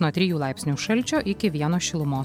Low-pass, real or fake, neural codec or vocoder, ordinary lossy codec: 19.8 kHz; real; none; MP3, 96 kbps